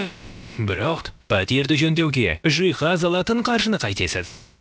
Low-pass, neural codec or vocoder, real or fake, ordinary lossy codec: none; codec, 16 kHz, about 1 kbps, DyCAST, with the encoder's durations; fake; none